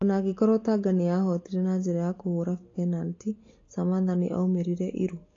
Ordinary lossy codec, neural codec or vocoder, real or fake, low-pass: AAC, 32 kbps; none; real; 7.2 kHz